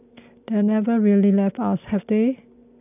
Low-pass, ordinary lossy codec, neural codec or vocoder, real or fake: 3.6 kHz; none; none; real